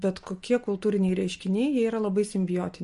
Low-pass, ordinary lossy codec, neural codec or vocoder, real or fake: 10.8 kHz; MP3, 48 kbps; none; real